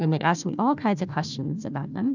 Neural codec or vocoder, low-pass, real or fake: codec, 16 kHz, 1 kbps, FunCodec, trained on Chinese and English, 50 frames a second; 7.2 kHz; fake